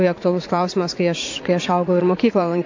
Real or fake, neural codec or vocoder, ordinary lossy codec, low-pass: fake; vocoder, 44.1 kHz, 80 mel bands, Vocos; AAC, 48 kbps; 7.2 kHz